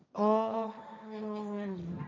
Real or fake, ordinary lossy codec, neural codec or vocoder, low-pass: fake; none; codec, 16 kHz, 1.1 kbps, Voila-Tokenizer; none